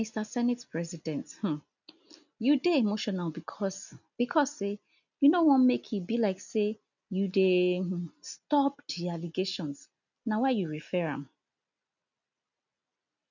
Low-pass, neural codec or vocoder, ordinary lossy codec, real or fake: 7.2 kHz; none; none; real